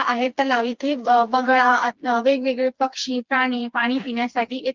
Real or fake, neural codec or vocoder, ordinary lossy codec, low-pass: fake; codec, 16 kHz, 2 kbps, FreqCodec, smaller model; Opus, 24 kbps; 7.2 kHz